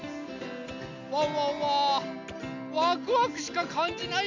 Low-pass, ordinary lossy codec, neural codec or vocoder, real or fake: 7.2 kHz; Opus, 64 kbps; none; real